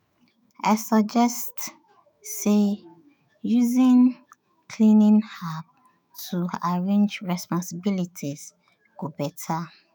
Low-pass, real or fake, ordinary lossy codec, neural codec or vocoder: none; fake; none; autoencoder, 48 kHz, 128 numbers a frame, DAC-VAE, trained on Japanese speech